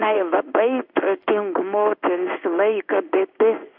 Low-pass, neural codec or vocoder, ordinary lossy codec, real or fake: 5.4 kHz; codec, 16 kHz in and 24 kHz out, 1 kbps, XY-Tokenizer; AAC, 48 kbps; fake